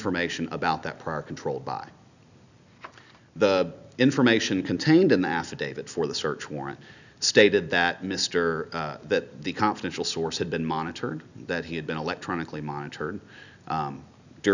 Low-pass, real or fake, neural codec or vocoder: 7.2 kHz; real; none